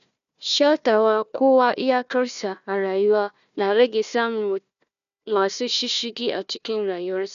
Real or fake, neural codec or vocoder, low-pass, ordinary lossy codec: fake; codec, 16 kHz, 1 kbps, FunCodec, trained on Chinese and English, 50 frames a second; 7.2 kHz; none